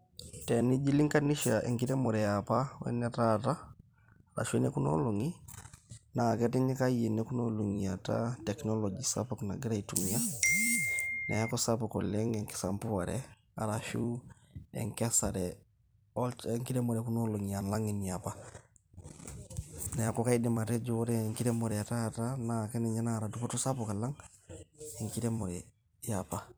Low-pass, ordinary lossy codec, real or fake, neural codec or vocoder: none; none; fake; vocoder, 44.1 kHz, 128 mel bands every 256 samples, BigVGAN v2